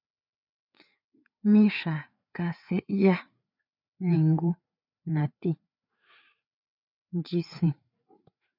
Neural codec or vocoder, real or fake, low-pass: codec, 16 kHz, 4 kbps, FreqCodec, larger model; fake; 5.4 kHz